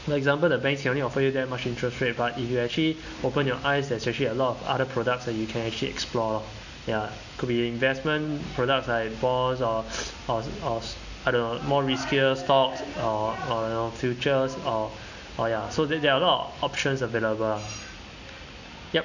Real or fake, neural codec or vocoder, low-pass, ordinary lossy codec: real; none; 7.2 kHz; none